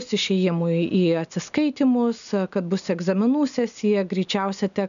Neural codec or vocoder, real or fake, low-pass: none; real; 7.2 kHz